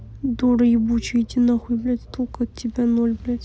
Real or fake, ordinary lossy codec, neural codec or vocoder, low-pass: real; none; none; none